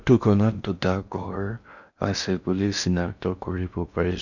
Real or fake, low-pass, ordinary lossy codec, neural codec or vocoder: fake; 7.2 kHz; none; codec, 16 kHz in and 24 kHz out, 0.6 kbps, FocalCodec, streaming, 4096 codes